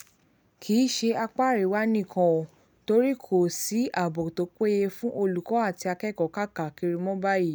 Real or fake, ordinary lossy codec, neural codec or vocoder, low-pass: real; none; none; none